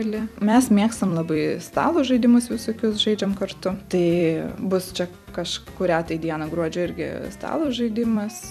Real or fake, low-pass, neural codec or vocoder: fake; 14.4 kHz; vocoder, 44.1 kHz, 128 mel bands every 256 samples, BigVGAN v2